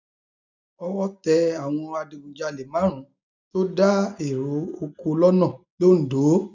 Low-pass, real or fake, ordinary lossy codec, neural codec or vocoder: 7.2 kHz; real; none; none